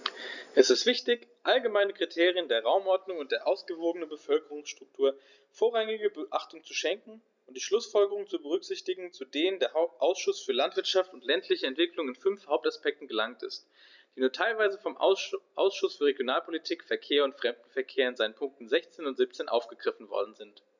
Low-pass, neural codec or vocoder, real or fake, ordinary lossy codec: 7.2 kHz; none; real; none